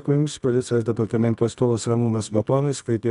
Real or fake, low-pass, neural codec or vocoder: fake; 10.8 kHz; codec, 24 kHz, 0.9 kbps, WavTokenizer, medium music audio release